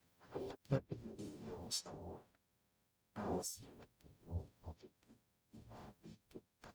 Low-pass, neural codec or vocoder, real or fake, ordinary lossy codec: none; codec, 44.1 kHz, 0.9 kbps, DAC; fake; none